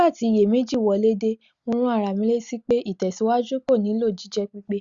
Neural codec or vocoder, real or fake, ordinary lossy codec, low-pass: none; real; Opus, 64 kbps; 7.2 kHz